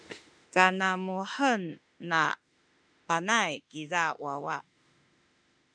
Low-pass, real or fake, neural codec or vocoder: 9.9 kHz; fake; autoencoder, 48 kHz, 32 numbers a frame, DAC-VAE, trained on Japanese speech